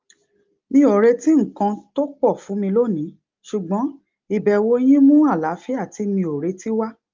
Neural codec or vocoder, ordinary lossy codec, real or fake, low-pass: none; Opus, 32 kbps; real; 7.2 kHz